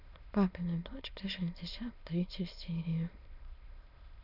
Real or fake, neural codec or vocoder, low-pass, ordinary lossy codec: fake; autoencoder, 22.05 kHz, a latent of 192 numbers a frame, VITS, trained on many speakers; 5.4 kHz; none